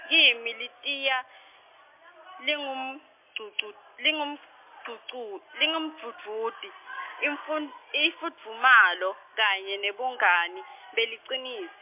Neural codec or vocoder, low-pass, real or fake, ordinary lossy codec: none; 3.6 kHz; real; MP3, 32 kbps